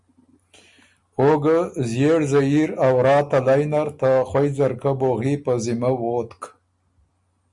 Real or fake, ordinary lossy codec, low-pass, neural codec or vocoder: real; MP3, 96 kbps; 10.8 kHz; none